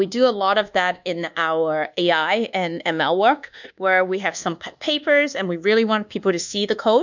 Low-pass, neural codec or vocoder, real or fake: 7.2 kHz; codec, 24 kHz, 1.2 kbps, DualCodec; fake